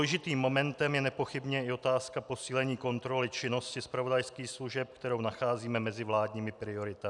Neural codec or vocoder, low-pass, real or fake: none; 10.8 kHz; real